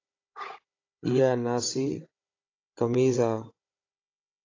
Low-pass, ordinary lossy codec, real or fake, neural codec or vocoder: 7.2 kHz; AAC, 32 kbps; fake; codec, 16 kHz, 16 kbps, FunCodec, trained on Chinese and English, 50 frames a second